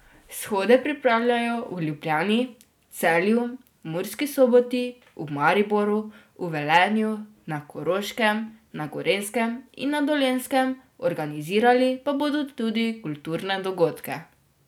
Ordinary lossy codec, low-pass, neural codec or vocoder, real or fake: none; 19.8 kHz; none; real